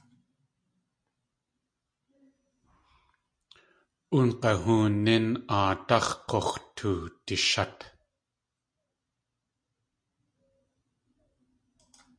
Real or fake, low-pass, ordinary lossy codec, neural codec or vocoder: real; 9.9 kHz; MP3, 48 kbps; none